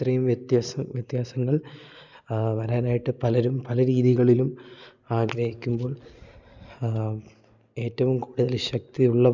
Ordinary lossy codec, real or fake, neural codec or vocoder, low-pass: none; real; none; 7.2 kHz